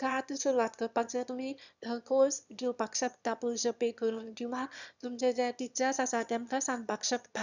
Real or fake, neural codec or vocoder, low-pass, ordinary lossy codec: fake; autoencoder, 22.05 kHz, a latent of 192 numbers a frame, VITS, trained on one speaker; 7.2 kHz; none